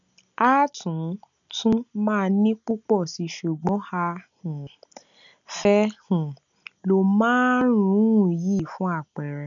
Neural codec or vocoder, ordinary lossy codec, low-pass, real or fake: none; none; 7.2 kHz; real